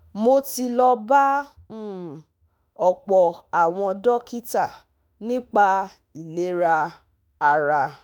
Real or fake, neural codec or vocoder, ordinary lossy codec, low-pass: fake; autoencoder, 48 kHz, 32 numbers a frame, DAC-VAE, trained on Japanese speech; none; none